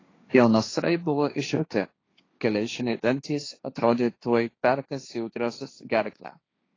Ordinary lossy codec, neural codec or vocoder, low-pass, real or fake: AAC, 32 kbps; codec, 16 kHz, 1.1 kbps, Voila-Tokenizer; 7.2 kHz; fake